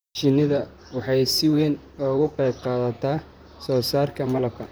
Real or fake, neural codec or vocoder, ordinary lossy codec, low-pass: fake; vocoder, 44.1 kHz, 128 mel bands, Pupu-Vocoder; none; none